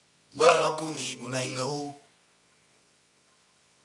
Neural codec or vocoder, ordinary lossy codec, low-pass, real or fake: codec, 24 kHz, 0.9 kbps, WavTokenizer, medium music audio release; MP3, 64 kbps; 10.8 kHz; fake